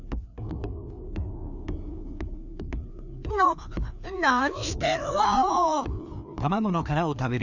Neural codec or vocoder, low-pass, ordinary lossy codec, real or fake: codec, 16 kHz, 2 kbps, FreqCodec, larger model; 7.2 kHz; none; fake